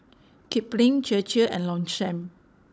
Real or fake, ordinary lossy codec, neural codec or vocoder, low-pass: fake; none; codec, 16 kHz, 16 kbps, FunCodec, trained on Chinese and English, 50 frames a second; none